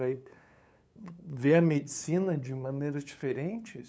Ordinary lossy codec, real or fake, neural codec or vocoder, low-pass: none; fake; codec, 16 kHz, 8 kbps, FunCodec, trained on LibriTTS, 25 frames a second; none